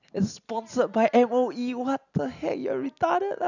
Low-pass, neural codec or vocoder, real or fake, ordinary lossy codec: 7.2 kHz; none; real; none